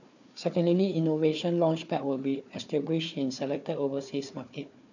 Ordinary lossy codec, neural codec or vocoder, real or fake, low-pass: none; codec, 16 kHz, 4 kbps, FunCodec, trained on Chinese and English, 50 frames a second; fake; 7.2 kHz